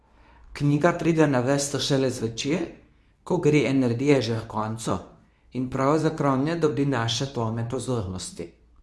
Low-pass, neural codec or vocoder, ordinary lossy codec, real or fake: none; codec, 24 kHz, 0.9 kbps, WavTokenizer, medium speech release version 2; none; fake